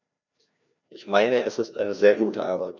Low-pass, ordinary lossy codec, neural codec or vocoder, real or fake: 7.2 kHz; none; codec, 16 kHz, 1 kbps, FreqCodec, larger model; fake